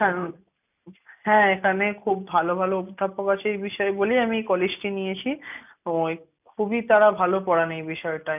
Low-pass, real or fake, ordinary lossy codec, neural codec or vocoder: 3.6 kHz; real; none; none